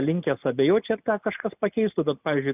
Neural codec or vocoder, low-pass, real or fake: none; 3.6 kHz; real